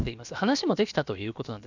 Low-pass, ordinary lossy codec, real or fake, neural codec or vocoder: 7.2 kHz; none; fake; codec, 16 kHz, about 1 kbps, DyCAST, with the encoder's durations